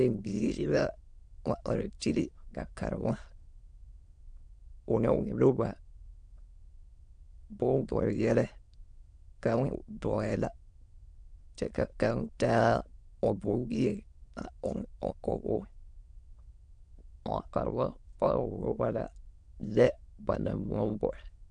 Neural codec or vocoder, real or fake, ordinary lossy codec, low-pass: autoencoder, 22.05 kHz, a latent of 192 numbers a frame, VITS, trained on many speakers; fake; MP3, 64 kbps; 9.9 kHz